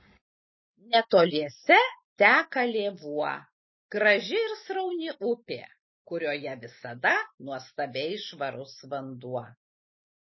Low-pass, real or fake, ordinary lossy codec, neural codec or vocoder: 7.2 kHz; real; MP3, 24 kbps; none